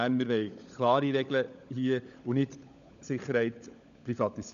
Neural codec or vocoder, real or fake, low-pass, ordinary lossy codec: codec, 16 kHz, 16 kbps, FunCodec, trained on LibriTTS, 50 frames a second; fake; 7.2 kHz; AAC, 96 kbps